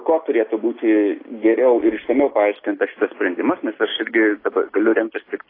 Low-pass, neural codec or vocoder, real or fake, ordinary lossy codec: 5.4 kHz; none; real; AAC, 24 kbps